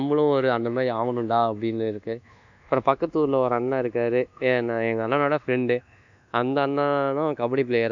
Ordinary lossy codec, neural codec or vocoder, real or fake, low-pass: none; codec, 24 kHz, 1.2 kbps, DualCodec; fake; 7.2 kHz